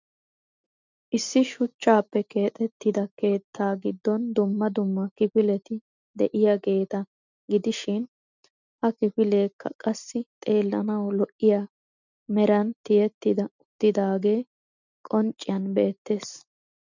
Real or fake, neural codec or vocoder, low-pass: real; none; 7.2 kHz